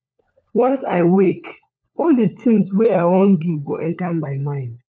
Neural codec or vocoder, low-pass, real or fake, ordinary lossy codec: codec, 16 kHz, 4 kbps, FunCodec, trained on LibriTTS, 50 frames a second; none; fake; none